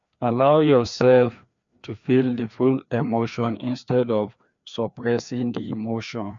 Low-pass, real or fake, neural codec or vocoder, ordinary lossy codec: 7.2 kHz; fake; codec, 16 kHz, 2 kbps, FreqCodec, larger model; MP3, 64 kbps